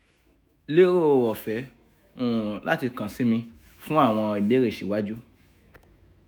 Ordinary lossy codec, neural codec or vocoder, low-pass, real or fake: none; autoencoder, 48 kHz, 128 numbers a frame, DAC-VAE, trained on Japanese speech; none; fake